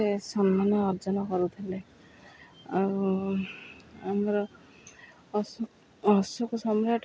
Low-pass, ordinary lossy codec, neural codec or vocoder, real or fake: none; none; none; real